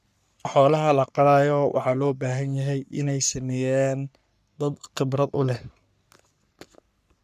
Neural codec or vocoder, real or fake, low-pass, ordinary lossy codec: codec, 44.1 kHz, 3.4 kbps, Pupu-Codec; fake; 14.4 kHz; none